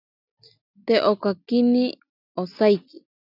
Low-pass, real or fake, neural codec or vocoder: 5.4 kHz; real; none